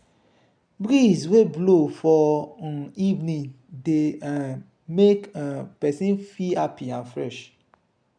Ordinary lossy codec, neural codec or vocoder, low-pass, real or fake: none; none; 9.9 kHz; real